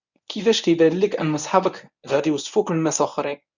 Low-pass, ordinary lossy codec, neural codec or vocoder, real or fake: 7.2 kHz; none; codec, 24 kHz, 0.9 kbps, WavTokenizer, medium speech release version 1; fake